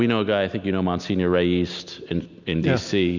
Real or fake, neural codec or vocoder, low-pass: real; none; 7.2 kHz